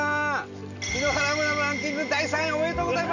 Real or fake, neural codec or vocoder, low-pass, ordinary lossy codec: real; none; 7.2 kHz; none